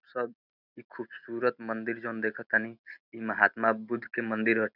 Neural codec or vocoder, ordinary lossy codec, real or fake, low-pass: none; none; real; 5.4 kHz